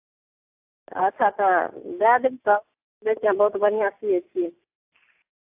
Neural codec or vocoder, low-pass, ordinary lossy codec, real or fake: none; 3.6 kHz; AAC, 32 kbps; real